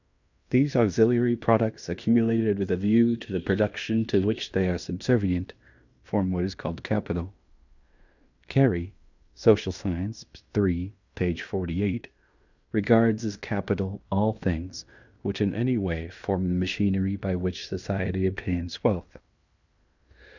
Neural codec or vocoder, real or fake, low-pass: codec, 16 kHz in and 24 kHz out, 0.9 kbps, LongCat-Audio-Codec, fine tuned four codebook decoder; fake; 7.2 kHz